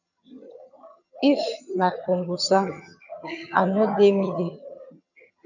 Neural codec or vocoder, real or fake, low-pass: vocoder, 22.05 kHz, 80 mel bands, HiFi-GAN; fake; 7.2 kHz